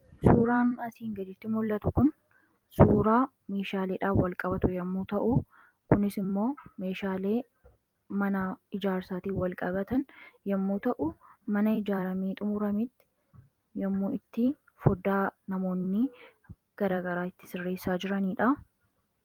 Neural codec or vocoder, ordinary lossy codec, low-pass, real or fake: vocoder, 44.1 kHz, 128 mel bands every 256 samples, BigVGAN v2; Opus, 32 kbps; 19.8 kHz; fake